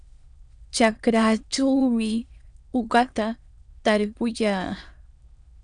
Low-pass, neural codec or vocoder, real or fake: 9.9 kHz; autoencoder, 22.05 kHz, a latent of 192 numbers a frame, VITS, trained on many speakers; fake